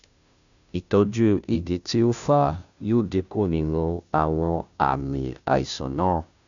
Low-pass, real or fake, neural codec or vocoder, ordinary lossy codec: 7.2 kHz; fake; codec, 16 kHz, 0.5 kbps, FunCodec, trained on Chinese and English, 25 frames a second; none